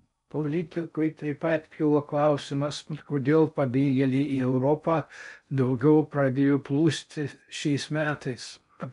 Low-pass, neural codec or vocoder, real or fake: 10.8 kHz; codec, 16 kHz in and 24 kHz out, 0.6 kbps, FocalCodec, streaming, 4096 codes; fake